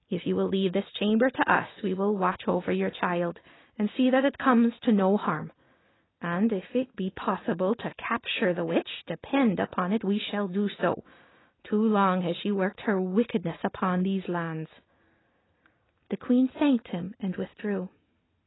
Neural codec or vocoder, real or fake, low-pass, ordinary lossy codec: none; real; 7.2 kHz; AAC, 16 kbps